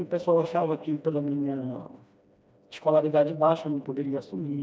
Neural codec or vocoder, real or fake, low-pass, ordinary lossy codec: codec, 16 kHz, 1 kbps, FreqCodec, smaller model; fake; none; none